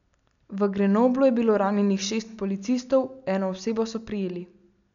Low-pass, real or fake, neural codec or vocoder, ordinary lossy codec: 7.2 kHz; real; none; none